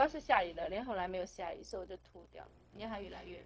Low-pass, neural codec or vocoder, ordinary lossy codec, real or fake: none; codec, 16 kHz, 0.4 kbps, LongCat-Audio-Codec; none; fake